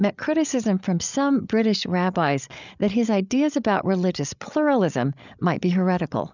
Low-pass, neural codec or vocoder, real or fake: 7.2 kHz; codec, 16 kHz, 8 kbps, FreqCodec, larger model; fake